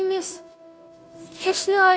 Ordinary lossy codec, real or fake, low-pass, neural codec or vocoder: none; fake; none; codec, 16 kHz, 0.5 kbps, FunCodec, trained on Chinese and English, 25 frames a second